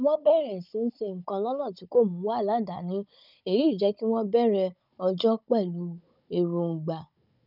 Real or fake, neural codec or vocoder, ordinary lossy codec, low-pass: fake; codec, 16 kHz, 16 kbps, FunCodec, trained on Chinese and English, 50 frames a second; none; 5.4 kHz